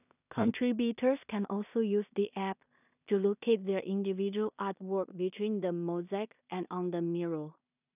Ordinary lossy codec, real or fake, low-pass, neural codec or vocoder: none; fake; 3.6 kHz; codec, 16 kHz in and 24 kHz out, 0.4 kbps, LongCat-Audio-Codec, two codebook decoder